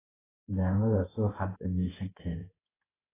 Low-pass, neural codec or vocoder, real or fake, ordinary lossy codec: 3.6 kHz; codec, 16 kHz in and 24 kHz out, 1 kbps, XY-Tokenizer; fake; AAC, 16 kbps